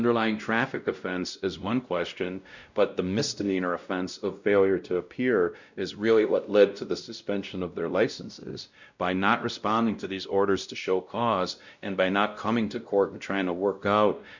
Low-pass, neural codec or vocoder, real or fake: 7.2 kHz; codec, 16 kHz, 0.5 kbps, X-Codec, WavLM features, trained on Multilingual LibriSpeech; fake